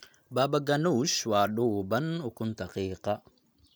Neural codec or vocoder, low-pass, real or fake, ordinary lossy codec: none; none; real; none